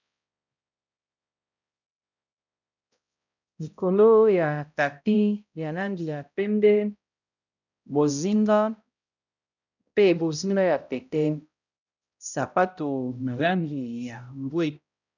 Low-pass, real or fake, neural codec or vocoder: 7.2 kHz; fake; codec, 16 kHz, 0.5 kbps, X-Codec, HuBERT features, trained on balanced general audio